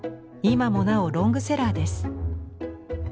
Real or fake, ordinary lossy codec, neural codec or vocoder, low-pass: real; none; none; none